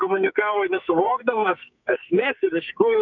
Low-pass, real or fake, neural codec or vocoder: 7.2 kHz; fake; codec, 44.1 kHz, 2.6 kbps, SNAC